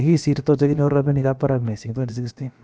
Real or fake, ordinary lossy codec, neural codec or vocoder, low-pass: fake; none; codec, 16 kHz, about 1 kbps, DyCAST, with the encoder's durations; none